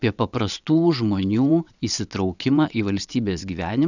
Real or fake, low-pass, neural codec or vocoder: fake; 7.2 kHz; vocoder, 22.05 kHz, 80 mel bands, WaveNeXt